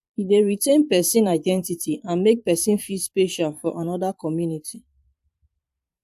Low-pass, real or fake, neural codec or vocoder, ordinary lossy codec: 14.4 kHz; fake; vocoder, 44.1 kHz, 128 mel bands every 512 samples, BigVGAN v2; AAC, 96 kbps